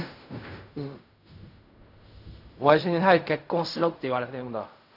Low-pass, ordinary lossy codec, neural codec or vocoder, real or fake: 5.4 kHz; none; codec, 16 kHz in and 24 kHz out, 0.4 kbps, LongCat-Audio-Codec, fine tuned four codebook decoder; fake